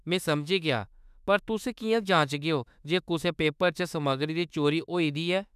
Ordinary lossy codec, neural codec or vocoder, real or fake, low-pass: none; autoencoder, 48 kHz, 32 numbers a frame, DAC-VAE, trained on Japanese speech; fake; 14.4 kHz